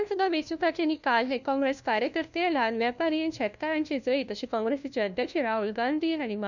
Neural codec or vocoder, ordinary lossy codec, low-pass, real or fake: codec, 16 kHz, 1 kbps, FunCodec, trained on LibriTTS, 50 frames a second; none; 7.2 kHz; fake